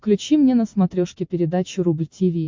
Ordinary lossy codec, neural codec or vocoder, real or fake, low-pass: MP3, 64 kbps; none; real; 7.2 kHz